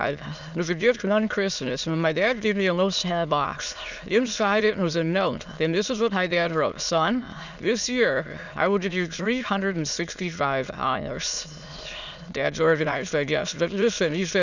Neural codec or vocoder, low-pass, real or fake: autoencoder, 22.05 kHz, a latent of 192 numbers a frame, VITS, trained on many speakers; 7.2 kHz; fake